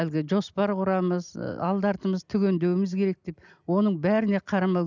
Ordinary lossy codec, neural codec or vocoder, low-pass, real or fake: none; none; 7.2 kHz; real